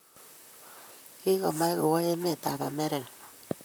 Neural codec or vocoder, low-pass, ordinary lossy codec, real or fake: vocoder, 44.1 kHz, 128 mel bands, Pupu-Vocoder; none; none; fake